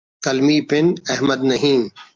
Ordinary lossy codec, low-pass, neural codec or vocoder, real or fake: Opus, 24 kbps; 7.2 kHz; none; real